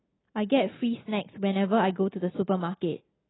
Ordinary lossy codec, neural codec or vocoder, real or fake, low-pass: AAC, 16 kbps; none; real; 7.2 kHz